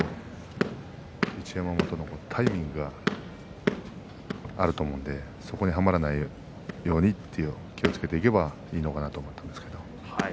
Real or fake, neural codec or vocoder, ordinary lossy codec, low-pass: real; none; none; none